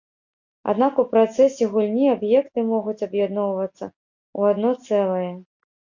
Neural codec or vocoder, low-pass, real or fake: none; 7.2 kHz; real